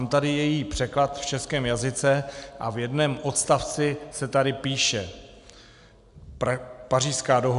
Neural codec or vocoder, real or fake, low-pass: none; real; 10.8 kHz